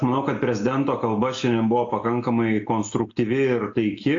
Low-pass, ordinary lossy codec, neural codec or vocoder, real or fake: 7.2 kHz; AAC, 48 kbps; none; real